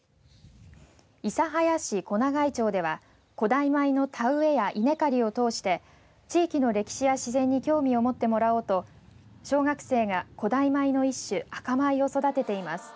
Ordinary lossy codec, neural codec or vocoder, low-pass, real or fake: none; none; none; real